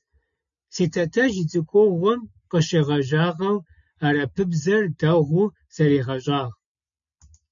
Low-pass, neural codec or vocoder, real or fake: 7.2 kHz; none; real